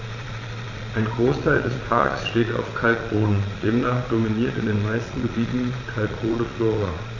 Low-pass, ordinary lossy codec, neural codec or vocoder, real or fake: 7.2 kHz; AAC, 32 kbps; vocoder, 22.05 kHz, 80 mel bands, Vocos; fake